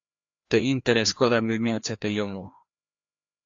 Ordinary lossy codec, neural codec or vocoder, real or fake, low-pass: AAC, 48 kbps; codec, 16 kHz, 1 kbps, FreqCodec, larger model; fake; 7.2 kHz